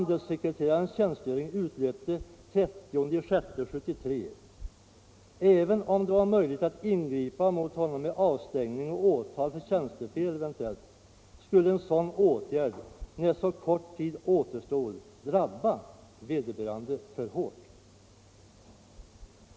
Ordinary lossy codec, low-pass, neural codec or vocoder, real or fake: none; none; none; real